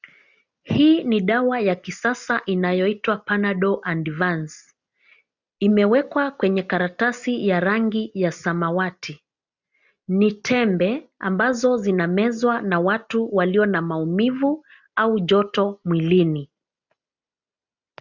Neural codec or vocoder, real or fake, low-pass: none; real; 7.2 kHz